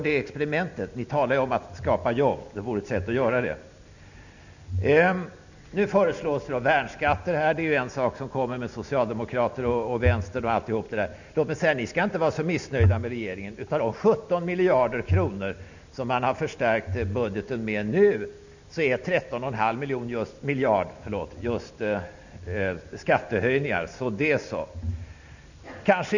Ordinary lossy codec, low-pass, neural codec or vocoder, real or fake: none; 7.2 kHz; none; real